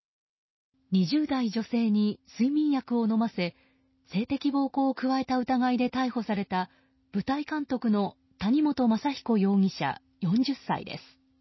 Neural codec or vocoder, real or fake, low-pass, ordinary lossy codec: none; real; 7.2 kHz; MP3, 24 kbps